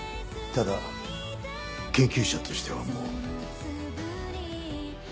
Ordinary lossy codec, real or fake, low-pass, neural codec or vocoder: none; real; none; none